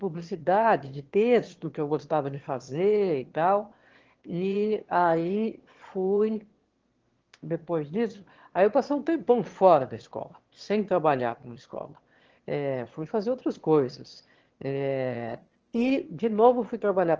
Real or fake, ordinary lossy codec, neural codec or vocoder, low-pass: fake; Opus, 16 kbps; autoencoder, 22.05 kHz, a latent of 192 numbers a frame, VITS, trained on one speaker; 7.2 kHz